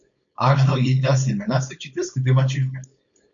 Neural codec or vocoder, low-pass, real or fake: codec, 16 kHz, 4.8 kbps, FACodec; 7.2 kHz; fake